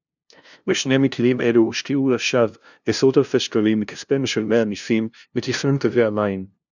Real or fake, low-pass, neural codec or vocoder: fake; 7.2 kHz; codec, 16 kHz, 0.5 kbps, FunCodec, trained on LibriTTS, 25 frames a second